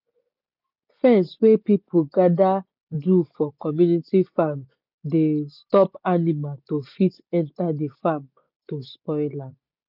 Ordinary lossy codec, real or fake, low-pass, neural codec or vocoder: none; real; 5.4 kHz; none